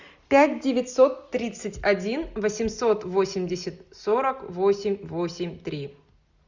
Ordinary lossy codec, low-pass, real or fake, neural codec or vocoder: Opus, 64 kbps; 7.2 kHz; real; none